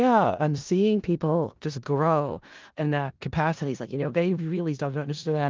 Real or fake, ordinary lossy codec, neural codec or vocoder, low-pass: fake; Opus, 32 kbps; codec, 16 kHz in and 24 kHz out, 0.4 kbps, LongCat-Audio-Codec, four codebook decoder; 7.2 kHz